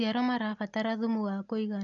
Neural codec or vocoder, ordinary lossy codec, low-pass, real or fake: none; none; 7.2 kHz; real